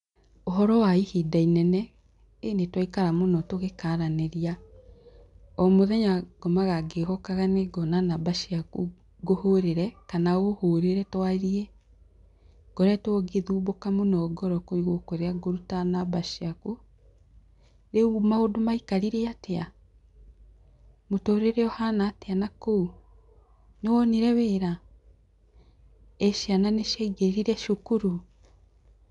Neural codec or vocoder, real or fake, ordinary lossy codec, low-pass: none; real; none; 10.8 kHz